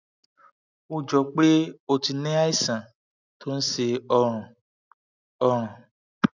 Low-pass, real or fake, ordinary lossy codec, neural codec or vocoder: 7.2 kHz; real; none; none